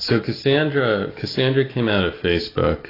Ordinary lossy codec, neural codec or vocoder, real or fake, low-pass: AAC, 24 kbps; none; real; 5.4 kHz